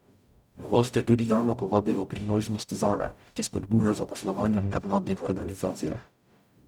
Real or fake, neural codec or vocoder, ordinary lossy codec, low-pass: fake; codec, 44.1 kHz, 0.9 kbps, DAC; none; 19.8 kHz